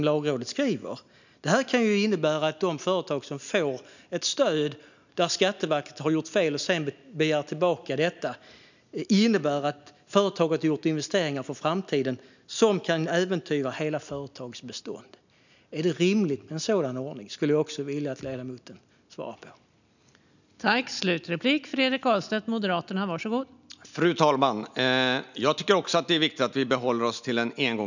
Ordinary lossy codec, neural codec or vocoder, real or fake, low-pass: none; none; real; 7.2 kHz